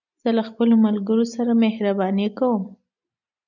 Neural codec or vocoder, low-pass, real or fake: none; 7.2 kHz; real